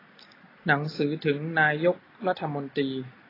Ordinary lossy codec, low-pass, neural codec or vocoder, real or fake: AAC, 24 kbps; 5.4 kHz; none; real